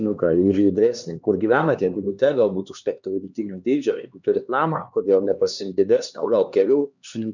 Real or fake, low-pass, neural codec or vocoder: fake; 7.2 kHz; codec, 16 kHz, 2 kbps, X-Codec, HuBERT features, trained on LibriSpeech